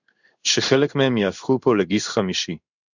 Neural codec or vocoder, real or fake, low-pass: codec, 16 kHz in and 24 kHz out, 1 kbps, XY-Tokenizer; fake; 7.2 kHz